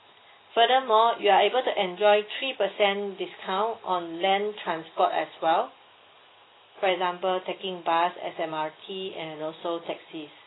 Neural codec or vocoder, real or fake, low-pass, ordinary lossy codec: none; real; 7.2 kHz; AAC, 16 kbps